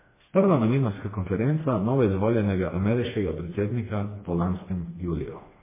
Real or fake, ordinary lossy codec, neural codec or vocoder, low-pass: fake; MP3, 16 kbps; codec, 16 kHz, 2 kbps, FreqCodec, smaller model; 3.6 kHz